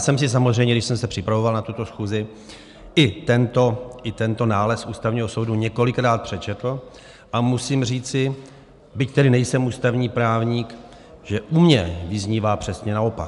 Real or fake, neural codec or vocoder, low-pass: real; none; 10.8 kHz